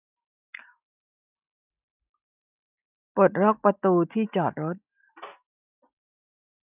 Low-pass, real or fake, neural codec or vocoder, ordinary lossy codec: 3.6 kHz; real; none; none